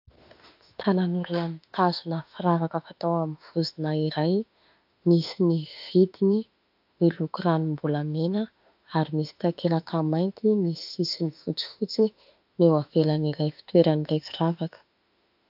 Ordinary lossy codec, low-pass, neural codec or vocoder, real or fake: AAC, 48 kbps; 5.4 kHz; autoencoder, 48 kHz, 32 numbers a frame, DAC-VAE, trained on Japanese speech; fake